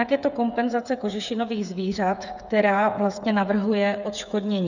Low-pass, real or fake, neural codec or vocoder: 7.2 kHz; fake; codec, 16 kHz, 8 kbps, FreqCodec, smaller model